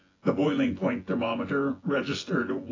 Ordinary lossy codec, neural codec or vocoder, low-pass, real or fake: AAC, 32 kbps; vocoder, 24 kHz, 100 mel bands, Vocos; 7.2 kHz; fake